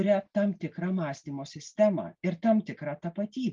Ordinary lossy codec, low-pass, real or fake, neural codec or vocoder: Opus, 32 kbps; 7.2 kHz; real; none